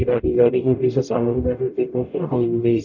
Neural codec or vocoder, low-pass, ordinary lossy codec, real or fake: codec, 44.1 kHz, 0.9 kbps, DAC; 7.2 kHz; none; fake